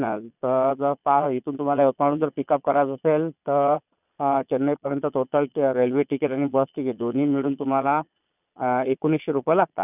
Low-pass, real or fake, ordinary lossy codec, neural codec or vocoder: 3.6 kHz; fake; none; vocoder, 22.05 kHz, 80 mel bands, Vocos